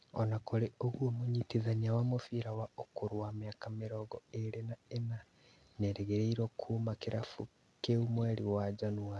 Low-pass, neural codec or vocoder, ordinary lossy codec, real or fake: none; none; none; real